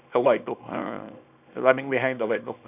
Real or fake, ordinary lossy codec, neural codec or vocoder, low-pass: fake; none; codec, 24 kHz, 0.9 kbps, WavTokenizer, small release; 3.6 kHz